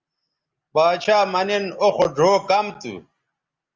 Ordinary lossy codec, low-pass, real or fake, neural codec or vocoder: Opus, 32 kbps; 7.2 kHz; real; none